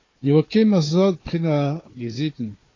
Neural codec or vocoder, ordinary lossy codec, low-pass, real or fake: codec, 16 kHz, 4 kbps, FunCodec, trained on Chinese and English, 50 frames a second; AAC, 32 kbps; 7.2 kHz; fake